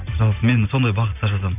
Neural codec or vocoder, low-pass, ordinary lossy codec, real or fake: none; 3.6 kHz; none; real